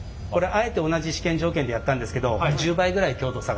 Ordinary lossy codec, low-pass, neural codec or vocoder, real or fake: none; none; none; real